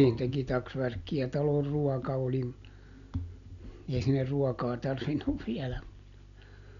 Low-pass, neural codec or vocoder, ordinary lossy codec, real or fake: 7.2 kHz; none; none; real